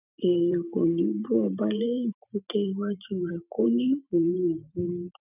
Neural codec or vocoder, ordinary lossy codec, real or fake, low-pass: vocoder, 44.1 kHz, 128 mel bands every 512 samples, BigVGAN v2; none; fake; 3.6 kHz